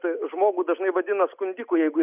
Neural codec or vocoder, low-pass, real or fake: none; 3.6 kHz; real